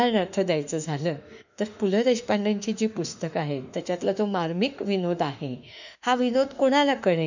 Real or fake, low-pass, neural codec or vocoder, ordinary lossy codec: fake; 7.2 kHz; autoencoder, 48 kHz, 32 numbers a frame, DAC-VAE, trained on Japanese speech; none